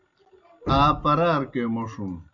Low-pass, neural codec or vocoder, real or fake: 7.2 kHz; none; real